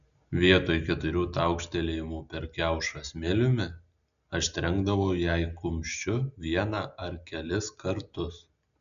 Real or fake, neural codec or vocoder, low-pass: real; none; 7.2 kHz